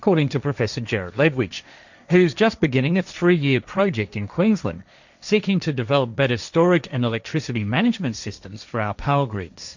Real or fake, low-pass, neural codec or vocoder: fake; 7.2 kHz; codec, 16 kHz, 1.1 kbps, Voila-Tokenizer